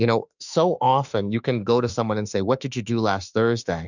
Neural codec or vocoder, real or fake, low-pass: autoencoder, 48 kHz, 32 numbers a frame, DAC-VAE, trained on Japanese speech; fake; 7.2 kHz